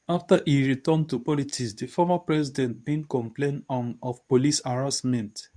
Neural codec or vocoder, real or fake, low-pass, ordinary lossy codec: codec, 24 kHz, 0.9 kbps, WavTokenizer, medium speech release version 2; fake; 9.9 kHz; none